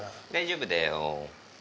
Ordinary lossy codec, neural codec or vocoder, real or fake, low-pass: none; none; real; none